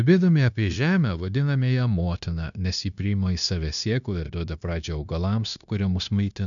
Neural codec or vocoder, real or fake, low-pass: codec, 16 kHz, 0.9 kbps, LongCat-Audio-Codec; fake; 7.2 kHz